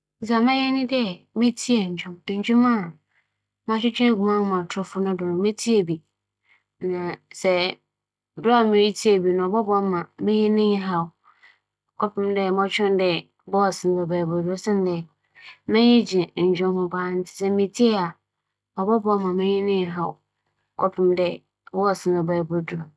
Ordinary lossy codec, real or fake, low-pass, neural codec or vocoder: none; real; none; none